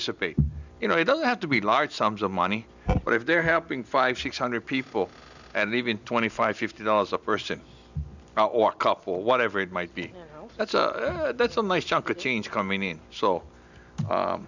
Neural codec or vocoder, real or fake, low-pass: none; real; 7.2 kHz